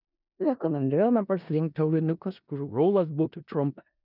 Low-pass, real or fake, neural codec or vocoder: 5.4 kHz; fake; codec, 16 kHz in and 24 kHz out, 0.4 kbps, LongCat-Audio-Codec, four codebook decoder